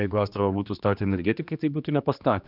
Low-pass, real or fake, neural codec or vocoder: 5.4 kHz; fake; codec, 16 kHz, 2 kbps, X-Codec, HuBERT features, trained on general audio